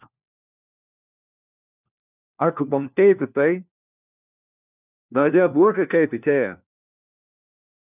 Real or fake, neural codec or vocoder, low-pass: fake; codec, 16 kHz, 1 kbps, FunCodec, trained on LibriTTS, 50 frames a second; 3.6 kHz